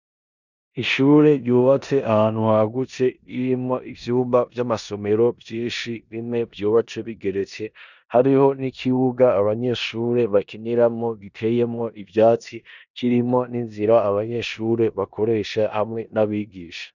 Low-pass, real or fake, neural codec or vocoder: 7.2 kHz; fake; codec, 16 kHz in and 24 kHz out, 0.9 kbps, LongCat-Audio-Codec, four codebook decoder